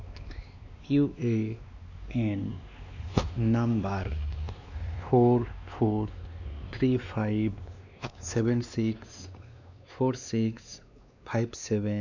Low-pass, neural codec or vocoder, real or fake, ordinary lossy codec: 7.2 kHz; codec, 16 kHz, 2 kbps, X-Codec, WavLM features, trained on Multilingual LibriSpeech; fake; none